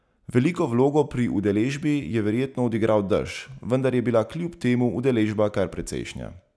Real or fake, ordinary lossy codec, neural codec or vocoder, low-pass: real; none; none; none